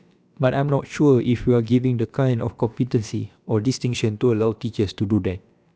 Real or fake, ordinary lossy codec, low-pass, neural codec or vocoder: fake; none; none; codec, 16 kHz, about 1 kbps, DyCAST, with the encoder's durations